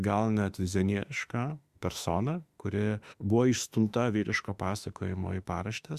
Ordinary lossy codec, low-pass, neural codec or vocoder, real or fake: Opus, 64 kbps; 14.4 kHz; autoencoder, 48 kHz, 32 numbers a frame, DAC-VAE, trained on Japanese speech; fake